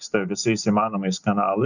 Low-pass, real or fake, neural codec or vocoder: 7.2 kHz; real; none